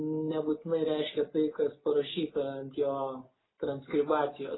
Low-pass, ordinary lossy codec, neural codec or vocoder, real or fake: 7.2 kHz; AAC, 16 kbps; none; real